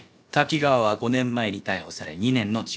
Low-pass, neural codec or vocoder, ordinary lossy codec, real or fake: none; codec, 16 kHz, about 1 kbps, DyCAST, with the encoder's durations; none; fake